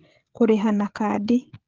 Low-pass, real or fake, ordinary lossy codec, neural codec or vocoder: 7.2 kHz; fake; Opus, 16 kbps; codec, 16 kHz, 16 kbps, FreqCodec, smaller model